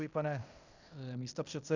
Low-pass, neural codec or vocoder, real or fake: 7.2 kHz; codec, 16 kHz in and 24 kHz out, 0.9 kbps, LongCat-Audio-Codec, fine tuned four codebook decoder; fake